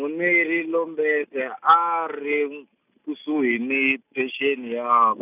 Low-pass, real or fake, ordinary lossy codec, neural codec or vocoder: 3.6 kHz; real; none; none